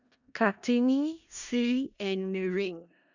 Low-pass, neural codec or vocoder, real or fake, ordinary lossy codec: 7.2 kHz; codec, 16 kHz in and 24 kHz out, 0.4 kbps, LongCat-Audio-Codec, four codebook decoder; fake; none